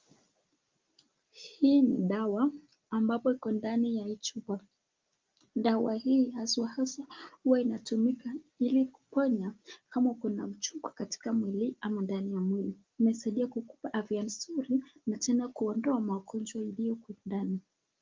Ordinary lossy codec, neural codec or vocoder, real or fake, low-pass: Opus, 32 kbps; none; real; 7.2 kHz